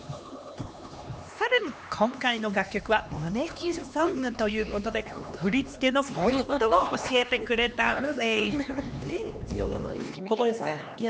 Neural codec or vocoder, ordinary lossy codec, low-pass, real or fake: codec, 16 kHz, 2 kbps, X-Codec, HuBERT features, trained on LibriSpeech; none; none; fake